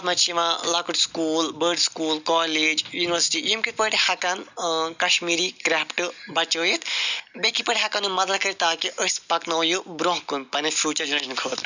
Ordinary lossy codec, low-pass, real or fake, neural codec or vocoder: none; 7.2 kHz; real; none